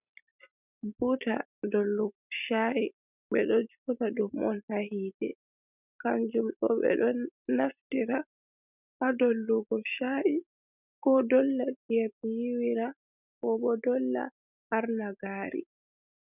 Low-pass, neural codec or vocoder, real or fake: 3.6 kHz; none; real